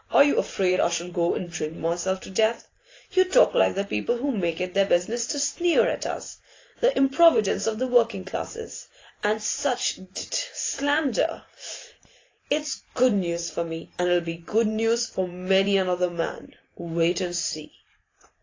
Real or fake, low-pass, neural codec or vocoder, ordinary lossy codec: fake; 7.2 kHz; vocoder, 44.1 kHz, 128 mel bands every 512 samples, BigVGAN v2; AAC, 32 kbps